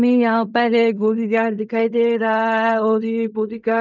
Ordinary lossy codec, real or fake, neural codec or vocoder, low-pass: none; fake; codec, 16 kHz in and 24 kHz out, 0.4 kbps, LongCat-Audio-Codec, fine tuned four codebook decoder; 7.2 kHz